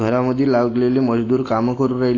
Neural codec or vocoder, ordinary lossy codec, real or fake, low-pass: none; AAC, 32 kbps; real; 7.2 kHz